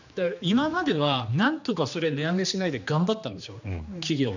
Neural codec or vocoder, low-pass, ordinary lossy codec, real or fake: codec, 16 kHz, 2 kbps, X-Codec, HuBERT features, trained on general audio; 7.2 kHz; none; fake